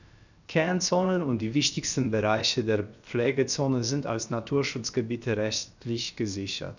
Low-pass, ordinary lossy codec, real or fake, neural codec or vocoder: 7.2 kHz; none; fake; codec, 16 kHz, 0.7 kbps, FocalCodec